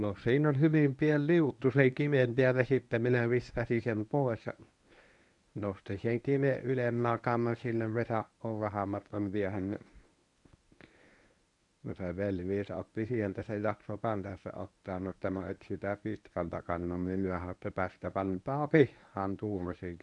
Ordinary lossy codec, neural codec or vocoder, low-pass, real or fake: none; codec, 24 kHz, 0.9 kbps, WavTokenizer, medium speech release version 1; 10.8 kHz; fake